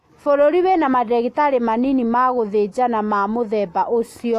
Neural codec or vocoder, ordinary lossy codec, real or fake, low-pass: none; AAC, 64 kbps; real; 14.4 kHz